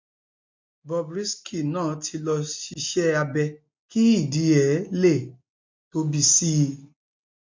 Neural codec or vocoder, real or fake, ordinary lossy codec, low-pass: none; real; MP3, 48 kbps; 7.2 kHz